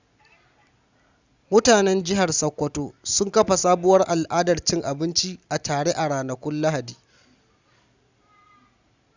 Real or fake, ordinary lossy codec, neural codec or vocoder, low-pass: real; Opus, 64 kbps; none; 7.2 kHz